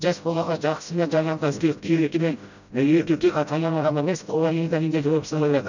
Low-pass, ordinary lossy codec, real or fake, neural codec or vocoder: 7.2 kHz; none; fake; codec, 16 kHz, 0.5 kbps, FreqCodec, smaller model